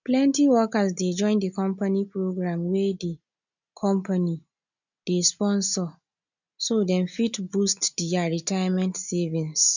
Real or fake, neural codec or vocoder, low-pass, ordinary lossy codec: real; none; 7.2 kHz; none